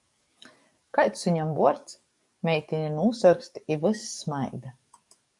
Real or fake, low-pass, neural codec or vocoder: fake; 10.8 kHz; codec, 44.1 kHz, 7.8 kbps, DAC